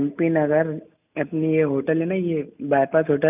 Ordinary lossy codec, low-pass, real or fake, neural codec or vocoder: none; 3.6 kHz; real; none